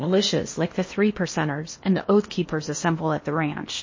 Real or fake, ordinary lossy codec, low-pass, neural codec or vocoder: fake; MP3, 32 kbps; 7.2 kHz; codec, 16 kHz in and 24 kHz out, 0.8 kbps, FocalCodec, streaming, 65536 codes